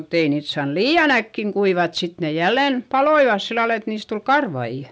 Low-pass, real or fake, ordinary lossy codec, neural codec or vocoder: none; real; none; none